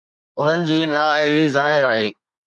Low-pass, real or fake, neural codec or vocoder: 10.8 kHz; fake; codec, 24 kHz, 1 kbps, SNAC